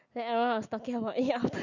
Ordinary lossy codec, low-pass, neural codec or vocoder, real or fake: none; 7.2 kHz; none; real